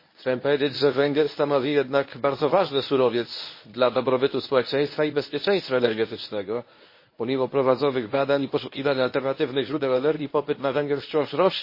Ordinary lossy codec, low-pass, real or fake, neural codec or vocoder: MP3, 24 kbps; 5.4 kHz; fake; codec, 24 kHz, 0.9 kbps, WavTokenizer, medium speech release version 1